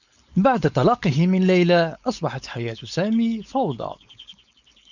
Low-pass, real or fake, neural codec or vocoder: 7.2 kHz; fake; codec, 16 kHz, 4.8 kbps, FACodec